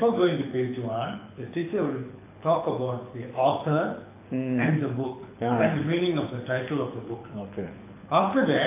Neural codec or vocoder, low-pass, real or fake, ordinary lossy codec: codec, 24 kHz, 6 kbps, HILCodec; 3.6 kHz; fake; AAC, 24 kbps